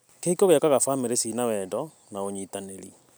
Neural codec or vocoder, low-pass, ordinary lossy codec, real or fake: none; none; none; real